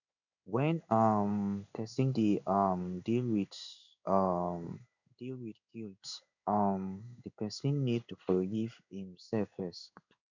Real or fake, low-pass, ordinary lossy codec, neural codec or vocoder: fake; 7.2 kHz; none; codec, 16 kHz in and 24 kHz out, 1 kbps, XY-Tokenizer